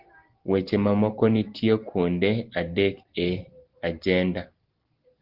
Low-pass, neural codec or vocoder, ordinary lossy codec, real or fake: 5.4 kHz; none; Opus, 16 kbps; real